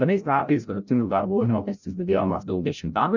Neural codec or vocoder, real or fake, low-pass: codec, 16 kHz, 0.5 kbps, FreqCodec, larger model; fake; 7.2 kHz